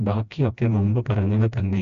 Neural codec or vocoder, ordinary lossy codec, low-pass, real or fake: codec, 16 kHz, 1 kbps, FreqCodec, smaller model; AAC, 64 kbps; 7.2 kHz; fake